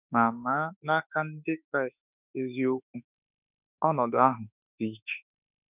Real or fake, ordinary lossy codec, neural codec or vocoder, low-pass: fake; none; autoencoder, 48 kHz, 32 numbers a frame, DAC-VAE, trained on Japanese speech; 3.6 kHz